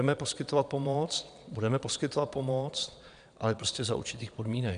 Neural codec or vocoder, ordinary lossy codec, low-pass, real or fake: vocoder, 22.05 kHz, 80 mel bands, Vocos; AAC, 96 kbps; 9.9 kHz; fake